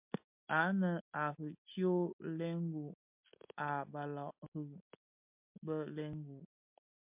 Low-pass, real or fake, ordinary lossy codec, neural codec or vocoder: 3.6 kHz; real; MP3, 32 kbps; none